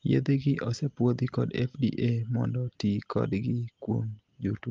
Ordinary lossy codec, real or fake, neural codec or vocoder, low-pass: Opus, 32 kbps; real; none; 7.2 kHz